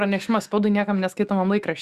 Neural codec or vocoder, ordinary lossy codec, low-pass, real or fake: autoencoder, 48 kHz, 128 numbers a frame, DAC-VAE, trained on Japanese speech; Opus, 64 kbps; 14.4 kHz; fake